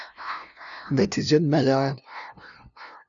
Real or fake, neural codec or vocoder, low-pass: fake; codec, 16 kHz, 0.5 kbps, FunCodec, trained on LibriTTS, 25 frames a second; 7.2 kHz